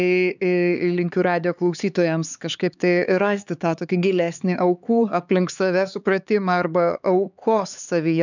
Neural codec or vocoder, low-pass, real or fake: codec, 16 kHz, 4 kbps, X-Codec, HuBERT features, trained on LibriSpeech; 7.2 kHz; fake